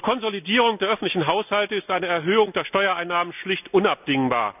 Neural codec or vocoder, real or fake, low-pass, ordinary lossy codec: none; real; 3.6 kHz; none